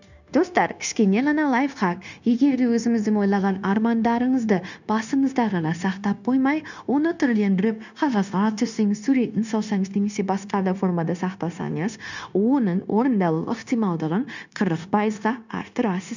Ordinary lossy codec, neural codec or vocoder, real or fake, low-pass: none; codec, 16 kHz, 0.9 kbps, LongCat-Audio-Codec; fake; 7.2 kHz